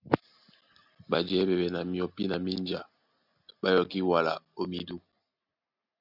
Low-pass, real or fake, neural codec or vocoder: 5.4 kHz; real; none